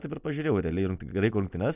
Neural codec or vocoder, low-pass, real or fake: none; 3.6 kHz; real